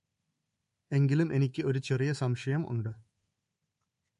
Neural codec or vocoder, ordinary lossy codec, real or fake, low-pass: codec, 24 kHz, 3.1 kbps, DualCodec; MP3, 48 kbps; fake; 10.8 kHz